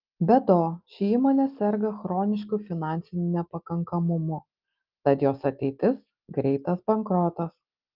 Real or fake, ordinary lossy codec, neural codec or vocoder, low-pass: real; Opus, 32 kbps; none; 5.4 kHz